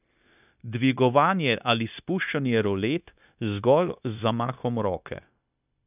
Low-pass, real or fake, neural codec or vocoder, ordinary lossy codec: 3.6 kHz; fake; codec, 16 kHz, 0.9 kbps, LongCat-Audio-Codec; none